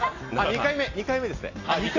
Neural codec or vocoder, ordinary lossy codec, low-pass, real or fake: none; AAC, 32 kbps; 7.2 kHz; real